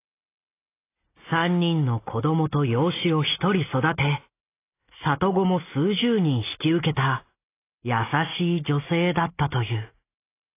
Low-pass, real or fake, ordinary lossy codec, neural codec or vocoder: 3.6 kHz; real; AAC, 24 kbps; none